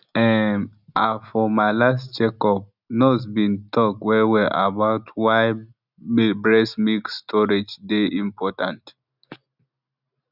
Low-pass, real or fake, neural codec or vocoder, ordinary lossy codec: 5.4 kHz; real; none; none